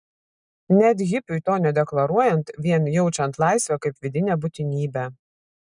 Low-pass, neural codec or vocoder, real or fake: 10.8 kHz; none; real